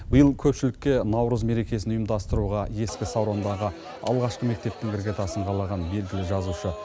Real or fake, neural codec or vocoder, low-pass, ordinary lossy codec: real; none; none; none